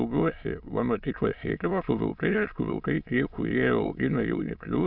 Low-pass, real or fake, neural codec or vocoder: 5.4 kHz; fake; autoencoder, 22.05 kHz, a latent of 192 numbers a frame, VITS, trained on many speakers